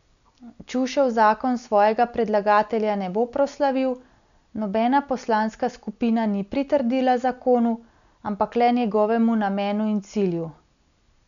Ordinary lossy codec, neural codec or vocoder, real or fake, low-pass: none; none; real; 7.2 kHz